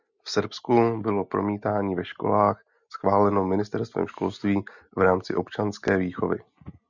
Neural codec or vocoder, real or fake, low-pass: none; real; 7.2 kHz